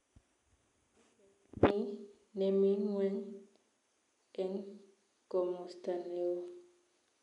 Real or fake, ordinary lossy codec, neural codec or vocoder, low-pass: real; none; none; 10.8 kHz